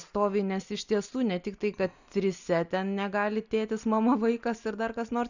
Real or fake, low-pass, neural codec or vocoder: real; 7.2 kHz; none